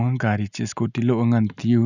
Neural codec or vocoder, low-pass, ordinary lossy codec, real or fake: none; 7.2 kHz; none; real